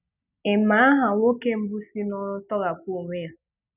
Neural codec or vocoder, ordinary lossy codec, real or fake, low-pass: none; none; real; 3.6 kHz